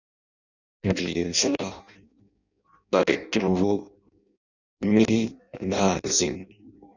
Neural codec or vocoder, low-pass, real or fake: codec, 16 kHz in and 24 kHz out, 0.6 kbps, FireRedTTS-2 codec; 7.2 kHz; fake